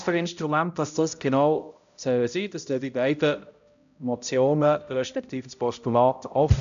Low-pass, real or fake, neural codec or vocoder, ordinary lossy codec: 7.2 kHz; fake; codec, 16 kHz, 0.5 kbps, X-Codec, HuBERT features, trained on balanced general audio; AAC, 96 kbps